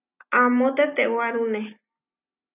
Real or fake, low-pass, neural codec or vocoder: real; 3.6 kHz; none